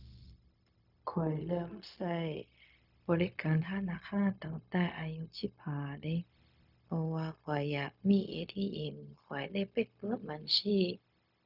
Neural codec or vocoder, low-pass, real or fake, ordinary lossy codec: codec, 16 kHz, 0.4 kbps, LongCat-Audio-Codec; 5.4 kHz; fake; none